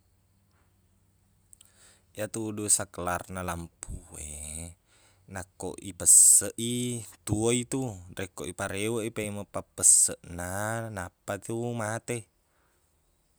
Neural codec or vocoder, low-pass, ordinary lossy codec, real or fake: none; none; none; real